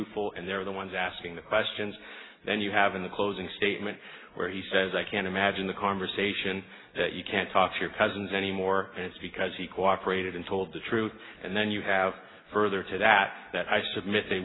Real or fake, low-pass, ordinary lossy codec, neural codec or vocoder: real; 7.2 kHz; AAC, 16 kbps; none